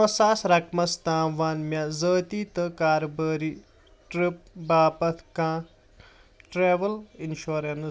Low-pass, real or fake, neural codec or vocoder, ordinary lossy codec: none; real; none; none